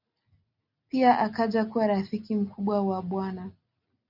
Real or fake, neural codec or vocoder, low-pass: real; none; 5.4 kHz